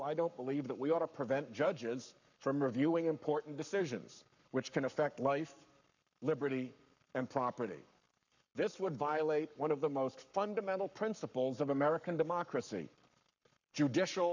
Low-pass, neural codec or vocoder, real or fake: 7.2 kHz; codec, 44.1 kHz, 7.8 kbps, Pupu-Codec; fake